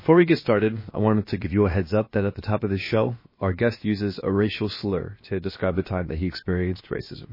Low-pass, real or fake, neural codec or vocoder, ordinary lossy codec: 5.4 kHz; fake; codec, 16 kHz, about 1 kbps, DyCAST, with the encoder's durations; MP3, 24 kbps